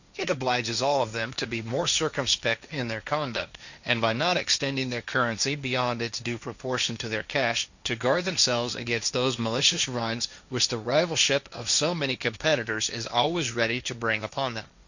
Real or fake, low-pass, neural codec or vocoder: fake; 7.2 kHz; codec, 16 kHz, 1.1 kbps, Voila-Tokenizer